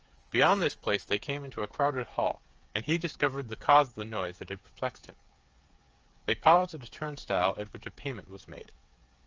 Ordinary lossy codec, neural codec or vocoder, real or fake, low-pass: Opus, 24 kbps; codec, 16 kHz, 8 kbps, FreqCodec, smaller model; fake; 7.2 kHz